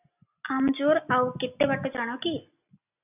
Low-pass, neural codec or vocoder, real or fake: 3.6 kHz; none; real